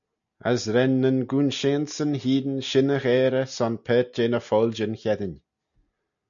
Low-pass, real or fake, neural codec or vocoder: 7.2 kHz; real; none